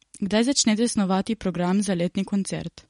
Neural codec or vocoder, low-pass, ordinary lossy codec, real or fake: none; 10.8 kHz; MP3, 48 kbps; real